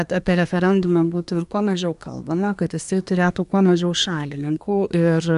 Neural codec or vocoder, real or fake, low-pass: codec, 24 kHz, 1 kbps, SNAC; fake; 10.8 kHz